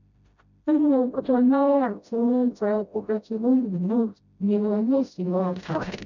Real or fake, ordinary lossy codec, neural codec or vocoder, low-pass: fake; none; codec, 16 kHz, 0.5 kbps, FreqCodec, smaller model; 7.2 kHz